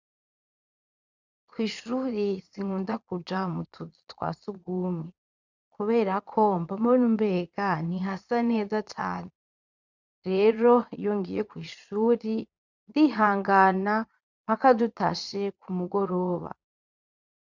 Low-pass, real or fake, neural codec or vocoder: 7.2 kHz; fake; vocoder, 22.05 kHz, 80 mel bands, Vocos